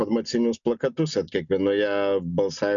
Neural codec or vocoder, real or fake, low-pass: none; real; 7.2 kHz